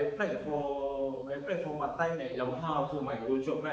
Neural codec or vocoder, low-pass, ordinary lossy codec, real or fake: codec, 16 kHz, 4 kbps, X-Codec, HuBERT features, trained on balanced general audio; none; none; fake